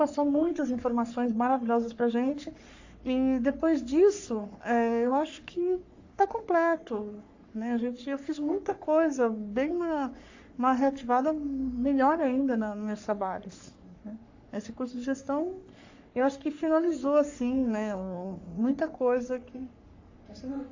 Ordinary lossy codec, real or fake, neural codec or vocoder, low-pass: AAC, 48 kbps; fake; codec, 44.1 kHz, 3.4 kbps, Pupu-Codec; 7.2 kHz